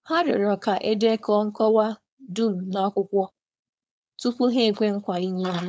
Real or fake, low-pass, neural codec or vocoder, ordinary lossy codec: fake; none; codec, 16 kHz, 4.8 kbps, FACodec; none